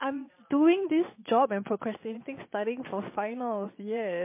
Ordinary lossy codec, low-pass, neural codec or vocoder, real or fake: MP3, 24 kbps; 3.6 kHz; vocoder, 22.05 kHz, 80 mel bands, Vocos; fake